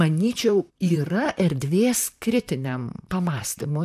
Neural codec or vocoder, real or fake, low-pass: vocoder, 44.1 kHz, 128 mel bands, Pupu-Vocoder; fake; 14.4 kHz